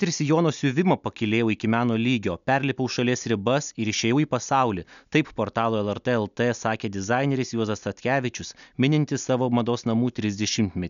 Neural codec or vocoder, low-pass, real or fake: none; 7.2 kHz; real